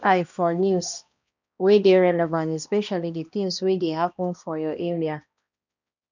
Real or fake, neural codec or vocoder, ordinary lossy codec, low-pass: fake; codec, 16 kHz, 1 kbps, X-Codec, HuBERT features, trained on balanced general audio; none; 7.2 kHz